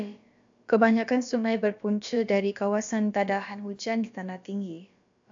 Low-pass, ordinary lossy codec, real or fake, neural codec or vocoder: 7.2 kHz; AAC, 64 kbps; fake; codec, 16 kHz, about 1 kbps, DyCAST, with the encoder's durations